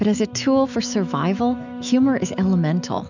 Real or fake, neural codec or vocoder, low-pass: fake; autoencoder, 48 kHz, 128 numbers a frame, DAC-VAE, trained on Japanese speech; 7.2 kHz